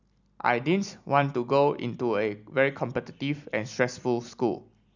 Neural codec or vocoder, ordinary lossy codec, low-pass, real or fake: none; none; 7.2 kHz; real